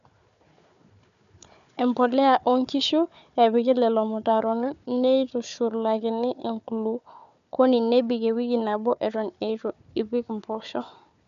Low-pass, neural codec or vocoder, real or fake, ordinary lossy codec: 7.2 kHz; codec, 16 kHz, 4 kbps, FunCodec, trained on Chinese and English, 50 frames a second; fake; none